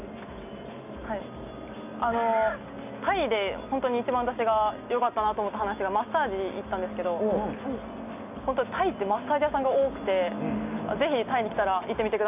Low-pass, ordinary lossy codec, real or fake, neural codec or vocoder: 3.6 kHz; none; real; none